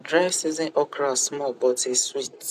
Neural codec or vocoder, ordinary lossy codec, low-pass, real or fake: vocoder, 44.1 kHz, 128 mel bands every 256 samples, BigVGAN v2; none; 14.4 kHz; fake